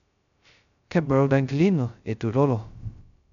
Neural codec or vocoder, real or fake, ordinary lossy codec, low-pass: codec, 16 kHz, 0.2 kbps, FocalCodec; fake; none; 7.2 kHz